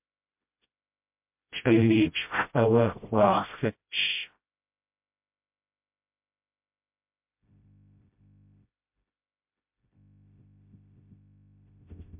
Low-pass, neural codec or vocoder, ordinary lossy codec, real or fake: 3.6 kHz; codec, 16 kHz, 0.5 kbps, FreqCodec, smaller model; MP3, 32 kbps; fake